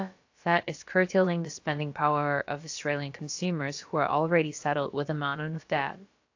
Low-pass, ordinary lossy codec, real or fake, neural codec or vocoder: 7.2 kHz; AAC, 48 kbps; fake; codec, 16 kHz, about 1 kbps, DyCAST, with the encoder's durations